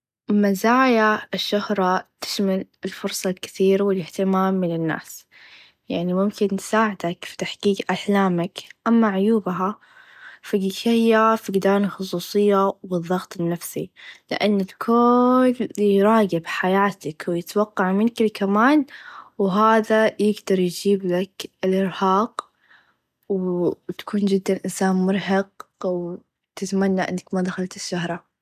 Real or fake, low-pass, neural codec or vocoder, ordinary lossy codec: real; 14.4 kHz; none; none